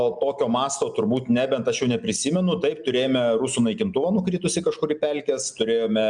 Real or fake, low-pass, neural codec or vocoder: real; 9.9 kHz; none